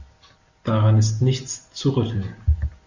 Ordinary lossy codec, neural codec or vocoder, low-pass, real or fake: Opus, 64 kbps; none; 7.2 kHz; real